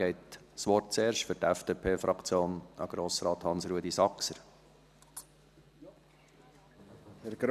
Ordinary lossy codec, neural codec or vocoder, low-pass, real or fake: none; none; 14.4 kHz; real